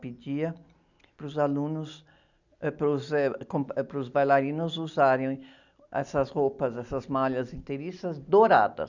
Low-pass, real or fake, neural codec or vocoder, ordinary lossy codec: 7.2 kHz; real; none; none